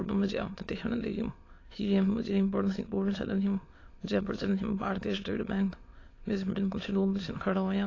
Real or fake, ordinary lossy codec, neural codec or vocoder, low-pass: fake; AAC, 32 kbps; autoencoder, 22.05 kHz, a latent of 192 numbers a frame, VITS, trained on many speakers; 7.2 kHz